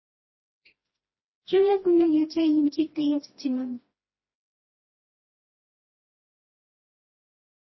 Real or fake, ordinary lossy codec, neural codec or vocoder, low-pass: fake; MP3, 24 kbps; codec, 16 kHz, 1 kbps, FreqCodec, smaller model; 7.2 kHz